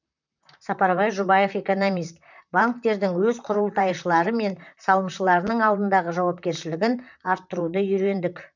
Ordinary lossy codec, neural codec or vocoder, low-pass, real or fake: none; vocoder, 44.1 kHz, 128 mel bands, Pupu-Vocoder; 7.2 kHz; fake